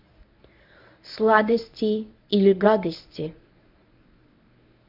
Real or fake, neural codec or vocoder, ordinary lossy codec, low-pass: fake; codec, 24 kHz, 0.9 kbps, WavTokenizer, medium speech release version 1; none; 5.4 kHz